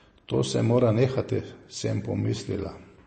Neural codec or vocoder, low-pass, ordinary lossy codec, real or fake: none; 10.8 kHz; MP3, 32 kbps; real